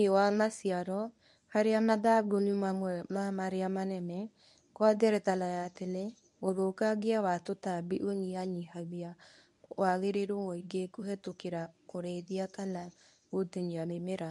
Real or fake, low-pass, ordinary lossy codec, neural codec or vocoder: fake; none; none; codec, 24 kHz, 0.9 kbps, WavTokenizer, medium speech release version 1